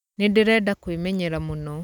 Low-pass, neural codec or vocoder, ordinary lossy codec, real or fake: 19.8 kHz; none; none; real